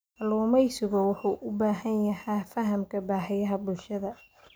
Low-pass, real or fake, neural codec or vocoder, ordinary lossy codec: none; real; none; none